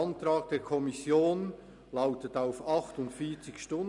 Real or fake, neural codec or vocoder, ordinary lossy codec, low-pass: real; none; none; 10.8 kHz